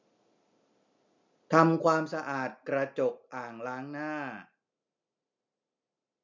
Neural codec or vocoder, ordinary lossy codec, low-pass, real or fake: none; MP3, 64 kbps; 7.2 kHz; real